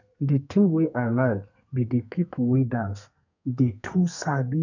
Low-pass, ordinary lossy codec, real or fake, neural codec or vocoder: 7.2 kHz; none; fake; codec, 32 kHz, 1.9 kbps, SNAC